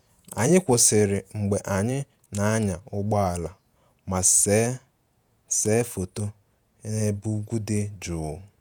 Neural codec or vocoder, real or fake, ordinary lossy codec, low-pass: vocoder, 48 kHz, 128 mel bands, Vocos; fake; none; none